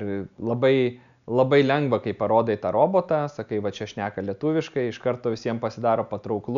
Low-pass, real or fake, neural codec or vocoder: 7.2 kHz; real; none